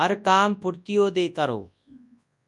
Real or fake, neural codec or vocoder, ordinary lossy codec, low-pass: fake; codec, 24 kHz, 0.9 kbps, WavTokenizer, large speech release; MP3, 96 kbps; 10.8 kHz